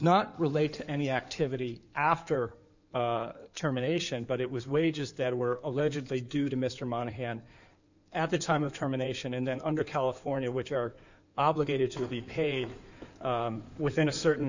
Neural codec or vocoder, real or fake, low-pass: codec, 16 kHz in and 24 kHz out, 2.2 kbps, FireRedTTS-2 codec; fake; 7.2 kHz